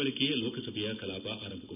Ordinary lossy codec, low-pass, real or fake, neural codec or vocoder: none; 3.6 kHz; real; none